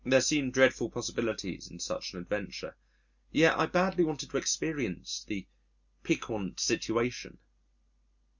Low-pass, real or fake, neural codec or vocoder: 7.2 kHz; real; none